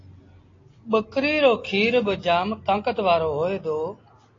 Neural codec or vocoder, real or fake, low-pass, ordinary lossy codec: none; real; 7.2 kHz; AAC, 32 kbps